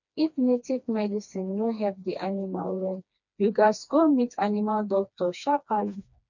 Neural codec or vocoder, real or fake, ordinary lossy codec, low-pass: codec, 16 kHz, 2 kbps, FreqCodec, smaller model; fake; none; 7.2 kHz